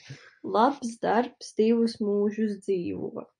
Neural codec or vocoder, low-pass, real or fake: none; 9.9 kHz; real